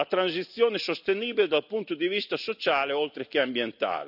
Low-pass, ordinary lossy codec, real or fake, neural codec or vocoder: 5.4 kHz; none; real; none